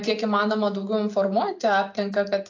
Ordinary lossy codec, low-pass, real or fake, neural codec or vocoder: AAC, 48 kbps; 7.2 kHz; real; none